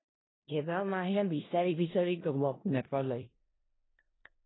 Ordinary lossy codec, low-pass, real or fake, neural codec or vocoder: AAC, 16 kbps; 7.2 kHz; fake; codec, 16 kHz in and 24 kHz out, 0.4 kbps, LongCat-Audio-Codec, four codebook decoder